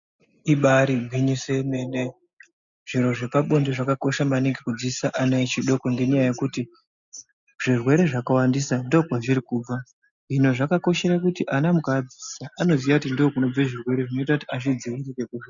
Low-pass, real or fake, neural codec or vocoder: 7.2 kHz; real; none